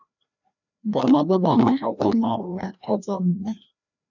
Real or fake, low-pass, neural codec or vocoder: fake; 7.2 kHz; codec, 16 kHz, 1 kbps, FreqCodec, larger model